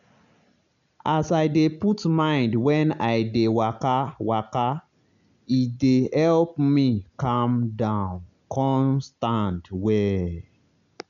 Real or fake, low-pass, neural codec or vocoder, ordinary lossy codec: real; 7.2 kHz; none; MP3, 96 kbps